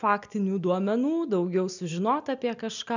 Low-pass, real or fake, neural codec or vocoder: 7.2 kHz; real; none